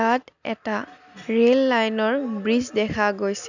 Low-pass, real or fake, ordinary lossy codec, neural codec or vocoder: 7.2 kHz; real; none; none